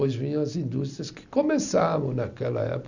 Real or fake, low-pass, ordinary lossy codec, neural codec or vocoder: real; 7.2 kHz; none; none